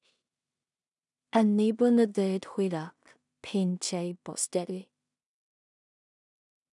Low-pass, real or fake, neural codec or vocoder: 10.8 kHz; fake; codec, 16 kHz in and 24 kHz out, 0.4 kbps, LongCat-Audio-Codec, two codebook decoder